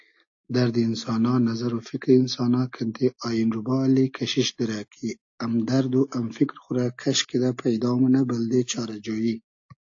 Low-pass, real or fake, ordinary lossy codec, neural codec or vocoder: 7.2 kHz; real; AAC, 48 kbps; none